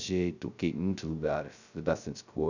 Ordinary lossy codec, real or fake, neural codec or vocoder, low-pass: none; fake; codec, 16 kHz, 0.2 kbps, FocalCodec; 7.2 kHz